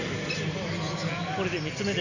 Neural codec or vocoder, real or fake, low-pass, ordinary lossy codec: none; real; 7.2 kHz; none